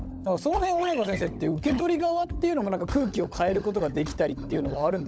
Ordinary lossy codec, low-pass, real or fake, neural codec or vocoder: none; none; fake; codec, 16 kHz, 16 kbps, FunCodec, trained on LibriTTS, 50 frames a second